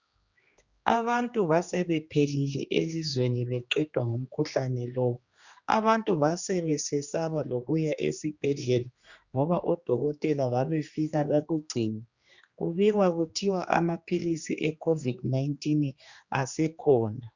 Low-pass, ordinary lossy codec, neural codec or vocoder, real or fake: 7.2 kHz; Opus, 64 kbps; codec, 16 kHz, 2 kbps, X-Codec, HuBERT features, trained on general audio; fake